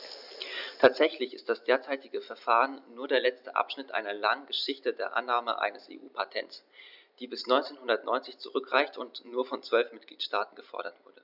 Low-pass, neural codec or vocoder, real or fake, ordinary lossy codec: 5.4 kHz; none; real; none